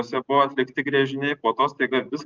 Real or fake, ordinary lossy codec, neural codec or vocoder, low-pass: real; Opus, 32 kbps; none; 7.2 kHz